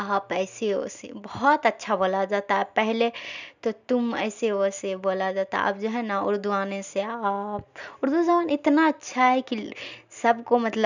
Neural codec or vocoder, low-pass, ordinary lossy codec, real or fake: none; 7.2 kHz; none; real